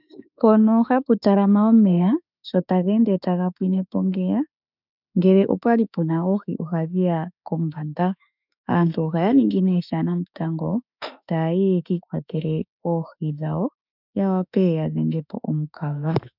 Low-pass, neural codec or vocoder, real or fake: 5.4 kHz; autoencoder, 48 kHz, 32 numbers a frame, DAC-VAE, trained on Japanese speech; fake